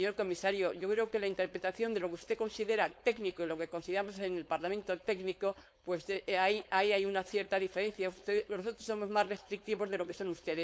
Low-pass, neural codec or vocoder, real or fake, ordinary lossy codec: none; codec, 16 kHz, 4.8 kbps, FACodec; fake; none